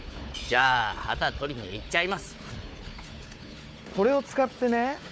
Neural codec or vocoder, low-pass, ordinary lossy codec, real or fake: codec, 16 kHz, 4 kbps, FunCodec, trained on Chinese and English, 50 frames a second; none; none; fake